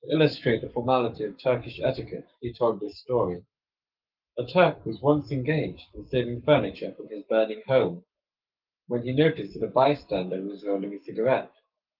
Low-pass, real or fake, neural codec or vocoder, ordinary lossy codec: 5.4 kHz; fake; codec, 44.1 kHz, 7.8 kbps, Pupu-Codec; Opus, 32 kbps